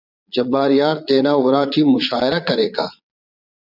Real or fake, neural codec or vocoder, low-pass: fake; vocoder, 22.05 kHz, 80 mel bands, Vocos; 5.4 kHz